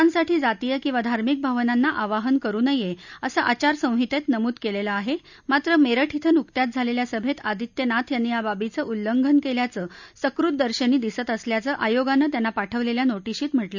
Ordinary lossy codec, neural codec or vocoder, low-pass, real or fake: none; none; 7.2 kHz; real